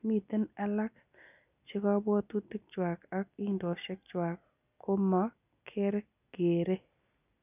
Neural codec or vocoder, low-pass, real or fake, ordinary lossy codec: none; 3.6 kHz; real; none